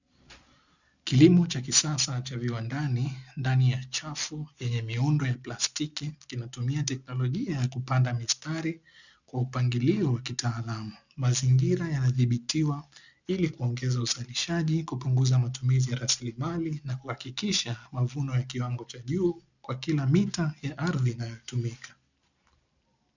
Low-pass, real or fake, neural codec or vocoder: 7.2 kHz; real; none